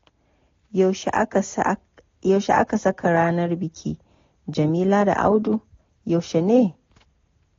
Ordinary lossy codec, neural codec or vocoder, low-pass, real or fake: AAC, 32 kbps; none; 7.2 kHz; real